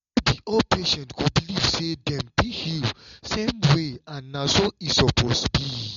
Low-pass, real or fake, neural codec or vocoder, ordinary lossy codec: 7.2 kHz; real; none; MP3, 48 kbps